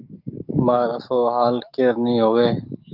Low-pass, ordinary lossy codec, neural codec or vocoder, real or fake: 5.4 kHz; Opus, 24 kbps; codec, 44.1 kHz, 7.8 kbps, DAC; fake